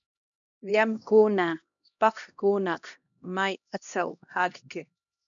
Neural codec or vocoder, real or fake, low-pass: codec, 16 kHz, 1 kbps, X-Codec, HuBERT features, trained on LibriSpeech; fake; 7.2 kHz